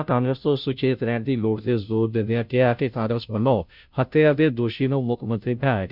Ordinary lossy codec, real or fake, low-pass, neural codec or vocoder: none; fake; 5.4 kHz; codec, 16 kHz, 0.5 kbps, FunCodec, trained on Chinese and English, 25 frames a second